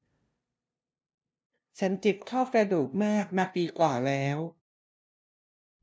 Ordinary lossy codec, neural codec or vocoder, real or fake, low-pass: none; codec, 16 kHz, 0.5 kbps, FunCodec, trained on LibriTTS, 25 frames a second; fake; none